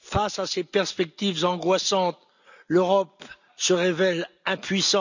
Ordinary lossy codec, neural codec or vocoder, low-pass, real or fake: none; none; 7.2 kHz; real